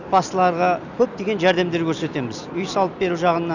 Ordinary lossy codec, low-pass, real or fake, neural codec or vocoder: none; 7.2 kHz; real; none